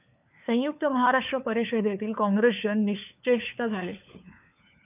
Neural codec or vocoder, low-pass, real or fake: codec, 16 kHz, 4 kbps, FunCodec, trained on LibriTTS, 50 frames a second; 3.6 kHz; fake